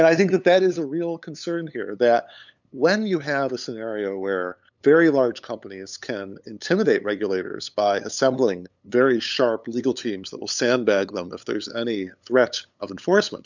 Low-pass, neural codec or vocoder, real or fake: 7.2 kHz; codec, 16 kHz, 8 kbps, FunCodec, trained on LibriTTS, 25 frames a second; fake